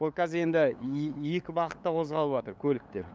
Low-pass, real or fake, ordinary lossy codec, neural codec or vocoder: none; fake; none; codec, 16 kHz, 8 kbps, FunCodec, trained on LibriTTS, 25 frames a second